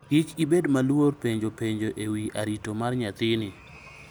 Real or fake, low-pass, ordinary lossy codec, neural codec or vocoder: real; none; none; none